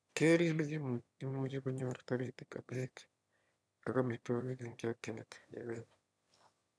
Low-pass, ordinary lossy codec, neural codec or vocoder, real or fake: none; none; autoencoder, 22.05 kHz, a latent of 192 numbers a frame, VITS, trained on one speaker; fake